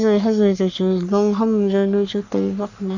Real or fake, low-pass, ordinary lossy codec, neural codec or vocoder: fake; 7.2 kHz; none; codec, 44.1 kHz, 3.4 kbps, Pupu-Codec